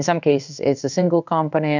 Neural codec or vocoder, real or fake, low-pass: codec, 16 kHz in and 24 kHz out, 1 kbps, XY-Tokenizer; fake; 7.2 kHz